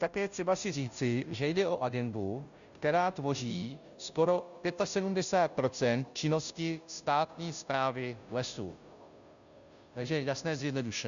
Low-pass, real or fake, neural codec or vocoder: 7.2 kHz; fake; codec, 16 kHz, 0.5 kbps, FunCodec, trained on Chinese and English, 25 frames a second